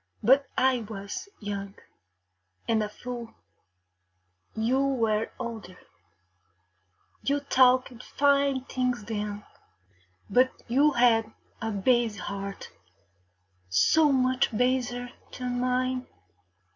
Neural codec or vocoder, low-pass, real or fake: none; 7.2 kHz; real